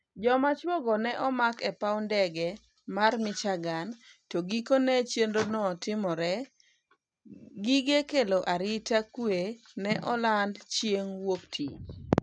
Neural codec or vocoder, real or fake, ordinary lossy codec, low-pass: none; real; none; none